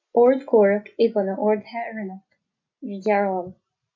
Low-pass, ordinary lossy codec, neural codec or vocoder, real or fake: 7.2 kHz; MP3, 48 kbps; none; real